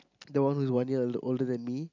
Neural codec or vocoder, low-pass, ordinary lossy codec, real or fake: none; 7.2 kHz; none; real